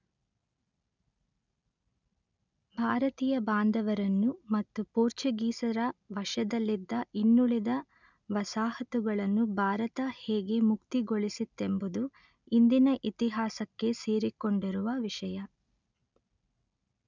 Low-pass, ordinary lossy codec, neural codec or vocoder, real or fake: 7.2 kHz; none; none; real